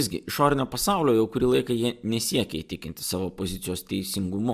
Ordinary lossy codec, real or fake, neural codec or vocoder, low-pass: Opus, 64 kbps; real; none; 14.4 kHz